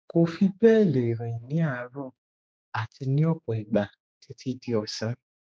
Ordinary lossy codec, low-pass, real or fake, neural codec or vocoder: none; none; fake; codec, 16 kHz, 2 kbps, X-Codec, HuBERT features, trained on general audio